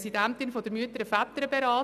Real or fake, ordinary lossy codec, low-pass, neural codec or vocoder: real; none; 14.4 kHz; none